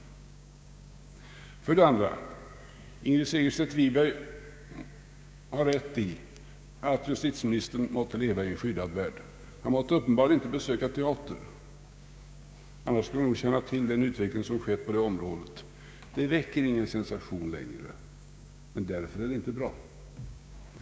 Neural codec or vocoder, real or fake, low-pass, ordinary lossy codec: codec, 16 kHz, 6 kbps, DAC; fake; none; none